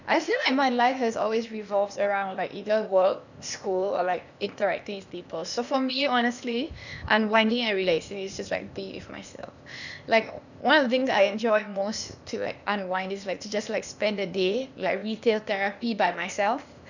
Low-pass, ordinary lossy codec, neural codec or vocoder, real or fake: 7.2 kHz; none; codec, 16 kHz, 0.8 kbps, ZipCodec; fake